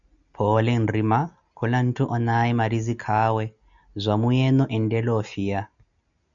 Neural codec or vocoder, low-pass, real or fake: none; 7.2 kHz; real